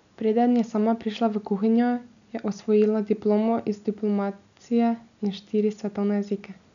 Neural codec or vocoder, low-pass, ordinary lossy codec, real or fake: none; 7.2 kHz; none; real